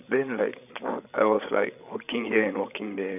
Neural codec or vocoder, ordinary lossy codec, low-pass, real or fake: codec, 16 kHz, 8 kbps, FreqCodec, larger model; none; 3.6 kHz; fake